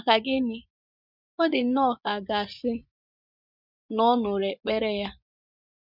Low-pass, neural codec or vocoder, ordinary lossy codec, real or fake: 5.4 kHz; vocoder, 24 kHz, 100 mel bands, Vocos; none; fake